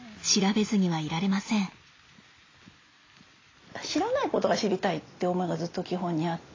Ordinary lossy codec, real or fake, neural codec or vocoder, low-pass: AAC, 32 kbps; real; none; 7.2 kHz